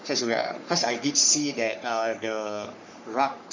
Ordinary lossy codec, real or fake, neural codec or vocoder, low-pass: MP3, 64 kbps; fake; codec, 44.1 kHz, 3.4 kbps, Pupu-Codec; 7.2 kHz